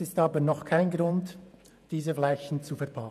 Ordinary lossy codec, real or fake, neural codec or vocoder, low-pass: none; fake; vocoder, 48 kHz, 128 mel bands, Vocos; 14.4 kHz